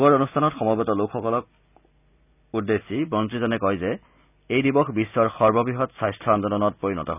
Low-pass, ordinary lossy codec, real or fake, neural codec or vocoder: 3.6 kHz; none; real; none